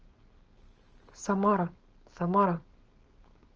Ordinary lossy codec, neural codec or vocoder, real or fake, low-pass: Opus, 24 kbps; codec, 16 kHz, 4.8 kbps, FACodec; fake; 7.2 kHz